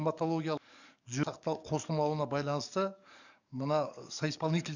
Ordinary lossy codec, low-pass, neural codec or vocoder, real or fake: none; 7.2 kHz; codec, 44.1 kHz, 7.8 kbps, DAC; fake